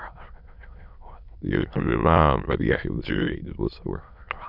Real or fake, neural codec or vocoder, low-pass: fake; autoencoder, 22.05 kHz, a latent of 192 numbers a frame, VITS, trained on many speakers; 5.4 kHz